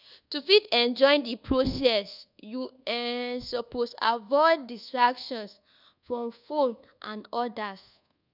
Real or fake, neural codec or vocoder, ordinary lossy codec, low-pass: fake; codec, 24 kHz, 1.2 kbps, DualCodec; none; 5.4 kHz